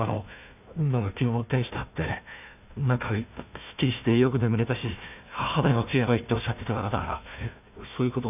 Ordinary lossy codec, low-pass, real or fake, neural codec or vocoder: none; 3.6 kHz; fake; codec, 16 kHz, 1 kbps, FunCodec, trained on Chinese and English, 50 frames a second